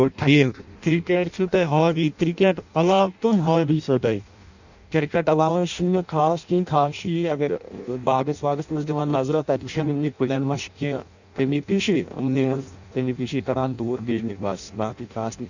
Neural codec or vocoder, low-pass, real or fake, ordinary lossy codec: codec, 16 kHz in and 24 kHz out, 0.6 kbps, FireRedTTS-2 codec; 7.2 kHz; fake; none